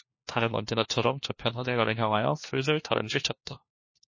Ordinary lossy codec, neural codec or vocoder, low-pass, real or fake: MP3, 32 kbps; codec, 16 kHz, 1 kbps, FunCodec, trained on LibriTTS, 50 frames a second; 7.2 kHz; fake